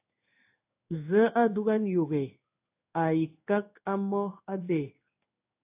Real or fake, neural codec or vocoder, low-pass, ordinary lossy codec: fake; codec, 16 kHz in and 24 kHz out, 1 kbps, XY-Tokenizer; 3.6 kHz; AAC, 32 kbps